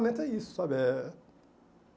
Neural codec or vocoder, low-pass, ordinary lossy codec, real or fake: none; none; none; real